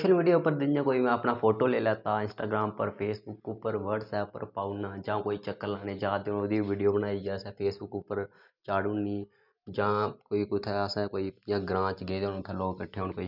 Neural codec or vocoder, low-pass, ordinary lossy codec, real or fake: none; 5.4 kHz; none; real